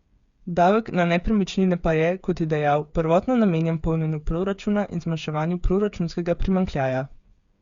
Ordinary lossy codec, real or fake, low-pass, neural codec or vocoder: Opus, 64 kbps; fake; 7.2 kHz; codec, 16 kHz, 8 kbps, FreqCodec, smaller model